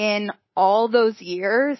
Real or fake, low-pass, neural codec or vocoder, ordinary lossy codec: fake; 7.2 kHz; codec, 24 kHz, 3.1 kbps, DualCodec; MP3, 24 kbps